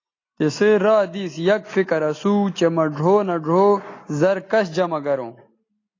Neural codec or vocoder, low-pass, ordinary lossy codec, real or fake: none; 7.2 kHz; AAC, 32 kbps; real